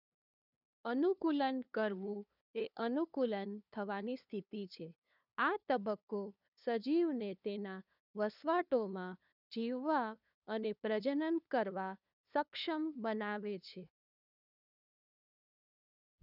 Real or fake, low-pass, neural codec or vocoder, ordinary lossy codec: fake; 5.4 kHz; codec, 16 kHz, 2 kbps, FunCodec, trained on LibriTTS, 25 frames a second; none